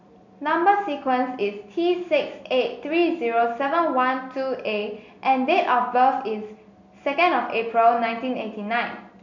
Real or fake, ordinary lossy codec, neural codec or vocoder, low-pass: real; none; none; 7.2 kHz